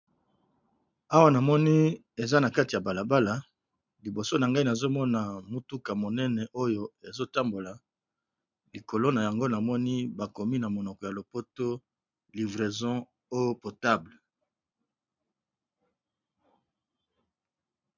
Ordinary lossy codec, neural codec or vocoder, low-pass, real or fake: MP3, 64 kbps; none; 7.2 kHz; real